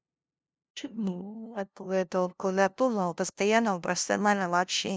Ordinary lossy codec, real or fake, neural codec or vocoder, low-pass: none; fake; codec, 16 kHz, 0.5 kbps, FunCodec, trained on LibriTTS, 25 frames a second; none